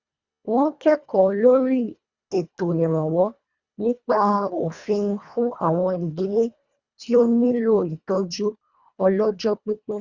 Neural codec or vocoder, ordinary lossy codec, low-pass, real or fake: codec, 24 kHz, 1.5 kbps, HILCodec; Opus, 64 kbps; 7.2 kHz; fake